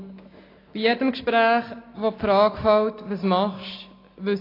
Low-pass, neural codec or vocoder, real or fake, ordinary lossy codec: 5.4 kHz; none; real; AAC, 24 kbps